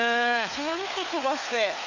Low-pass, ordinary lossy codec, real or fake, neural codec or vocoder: 7.2 kHz; none; fake; codec, 16 kHz, 4 kbps, FunCodec, trained on LibriTTS, 50 frames a second